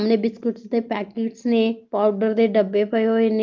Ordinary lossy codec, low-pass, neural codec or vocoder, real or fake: Opus, 24 kbps; 7.2 kHz; none; real